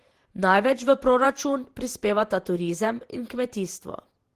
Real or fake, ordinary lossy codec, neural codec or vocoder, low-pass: fake; Opus, 16 kbps; vocoder, 48 kHz, 128 mel bands, Vocos; 14.4 kHz